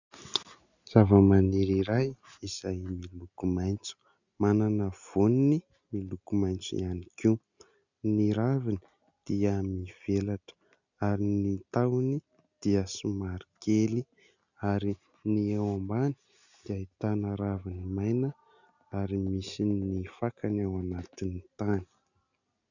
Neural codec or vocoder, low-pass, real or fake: none; 7.2 kHz; real